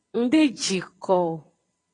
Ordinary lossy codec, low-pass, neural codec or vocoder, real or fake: AAC, 32 kbps; 9.9 kHz; vocoder, 22.05 kHz, 80 mel bands, WaveNeXt; fake